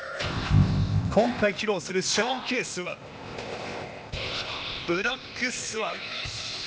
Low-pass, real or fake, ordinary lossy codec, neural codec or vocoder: none; fake; none; codec, 16 kHz, 0.8 kbps, ZipCodec